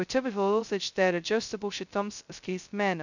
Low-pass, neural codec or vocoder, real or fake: 7.2 kHz; codec, 16 kHz, 0.2 kbps, FocalCodec; fake